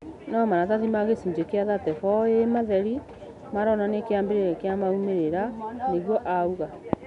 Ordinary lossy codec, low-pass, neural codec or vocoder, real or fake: none; 10.8 kHz; none; real